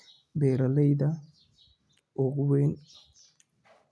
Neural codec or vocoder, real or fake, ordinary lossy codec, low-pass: none; real; none; none